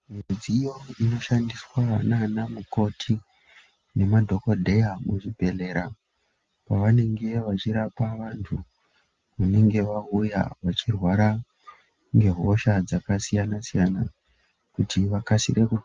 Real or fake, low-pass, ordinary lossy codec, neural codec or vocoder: real; 7.2 kHz; Opus, 32 kbps; none